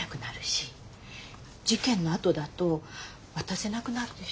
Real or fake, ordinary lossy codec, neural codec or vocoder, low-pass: real; none; none; none